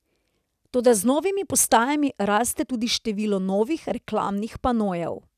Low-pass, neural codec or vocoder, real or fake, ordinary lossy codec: 14.4 kHz; none; real; none